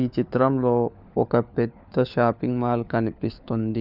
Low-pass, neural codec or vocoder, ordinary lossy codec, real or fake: 5.4 kHz; codec, 16 kHz, 4 kbps, FunCodec, trained on LibriTTS, 50 frames a second; none; fake